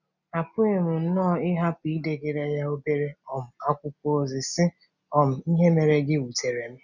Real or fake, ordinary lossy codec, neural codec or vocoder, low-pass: real; none; none; 7.2 kHz